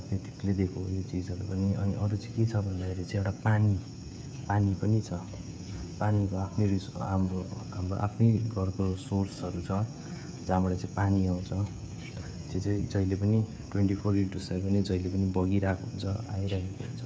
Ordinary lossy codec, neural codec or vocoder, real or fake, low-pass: none; codec, 16 kHz, 16 kbps, FreqCodec, smaller model; fake; none